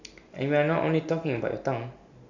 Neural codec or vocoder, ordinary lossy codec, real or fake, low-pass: none; none; real; 7.2 kHz